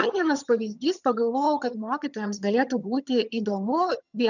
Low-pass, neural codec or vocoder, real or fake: 7.2 kHz; vocoder, 22.05 kHz, 80 mel bands, HiFi-GAN; fake